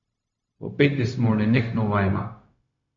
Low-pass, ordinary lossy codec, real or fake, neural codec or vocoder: 7.2 kHz; MP3, 48 kbps; fake; codec, 16 kHz, 0.4 kbps, LongCat-Audio-Codec